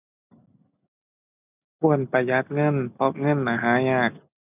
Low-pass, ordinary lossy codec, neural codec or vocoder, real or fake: 3.6 kHz; none; none; real